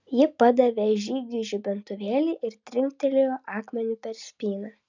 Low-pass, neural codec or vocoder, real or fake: 7.2 kHz; none; real